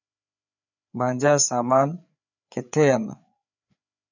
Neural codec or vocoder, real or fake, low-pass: codec, 16 kHz, 4 kbps, FreqCodec, larger model; fake; 7.2 kHz